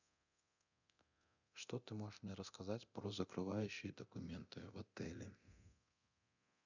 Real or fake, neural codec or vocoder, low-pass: fake; codec, 24 kHz, 0.9 kbps, DualCodec; 7.2 kHz